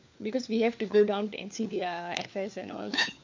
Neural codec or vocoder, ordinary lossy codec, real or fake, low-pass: codec, 16 kHz, 4 kbps, FunCodec, trained on LibriTTS, 50 frames a second; MP3, 64 kbps; fake; 7.2 kHz